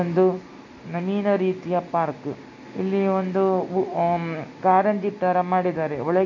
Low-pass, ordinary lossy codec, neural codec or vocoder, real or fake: 7.2 kHz; AAC, 48 kbps; none; real